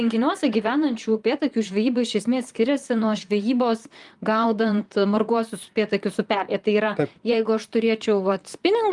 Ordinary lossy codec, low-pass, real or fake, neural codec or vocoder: Opus, 32 kbps; 10.8 kHz; fake; vocoder, 44.1 kHz, 128 mel bands, Pupu-Vocoder